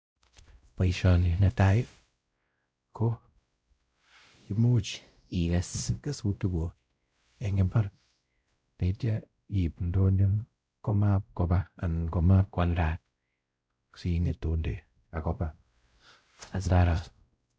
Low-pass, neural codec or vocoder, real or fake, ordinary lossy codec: none; codec, 16 kHz, 0.5 kbps, X-Codec, WavLM features, trained on Multilingual LibriSpeech; fake; none